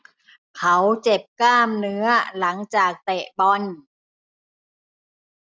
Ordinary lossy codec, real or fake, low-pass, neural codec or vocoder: none; real; none; none